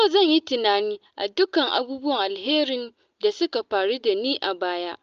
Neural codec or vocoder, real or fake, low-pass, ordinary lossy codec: none; real; 7.2 kHz; Opus, 24 kbps